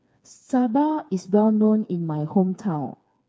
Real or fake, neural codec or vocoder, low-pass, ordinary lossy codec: fake; codec, 16 kHz, 4 kbps, FreqCodec, smaller model; none; none